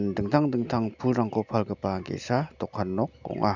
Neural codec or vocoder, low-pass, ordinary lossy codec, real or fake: none; 7.2 kHz; none; real